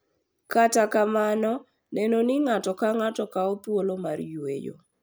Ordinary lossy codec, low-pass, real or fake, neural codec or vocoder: none; none; real; none